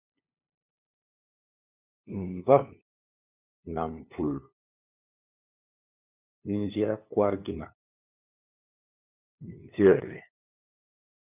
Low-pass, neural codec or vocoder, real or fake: 3.6 kHz; codec, 16 kHz, 2 kbps, FunCodec, trained on LibriTTS, 25 frames a second; fake